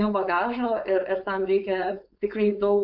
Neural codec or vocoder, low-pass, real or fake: codec, 16 kHz, 4.8 kbps, FACodec; 5.4 kHz; fake